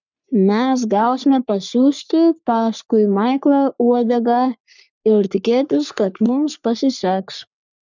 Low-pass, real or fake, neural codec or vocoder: 7.2 kHz; fake; codec, 44.1 kHz, 3.4 kbps, Pupu-Codec